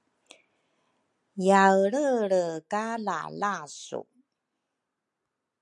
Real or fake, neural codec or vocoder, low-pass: real; none; 10.8 kHz